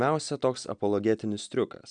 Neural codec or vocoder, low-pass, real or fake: none; 9.9 kHz; real